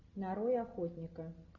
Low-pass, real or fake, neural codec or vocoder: 7.2 kHz; real; none